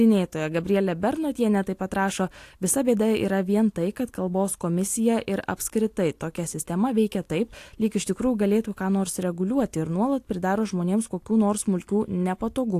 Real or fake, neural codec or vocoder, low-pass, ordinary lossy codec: real; none; 14.4 kHz; AAC, 64 kbps